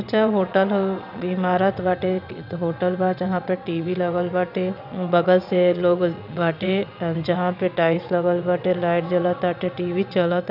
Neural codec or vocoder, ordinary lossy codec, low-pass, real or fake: vocoder, 22.05 kHz, 80 mel bands, WaveNeXt; none; 5.4 kHz; fake